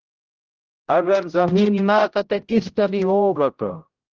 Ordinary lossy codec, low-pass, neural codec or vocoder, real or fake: Opus, 16 kbps; 7.2 kHz; codec, 16 kHz, 0.5 kbps, X-Codec, HuBERT features, trained on general audio; fake